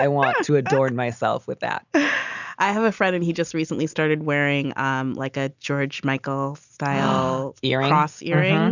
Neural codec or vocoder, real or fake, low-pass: none; real; 7.2 kHz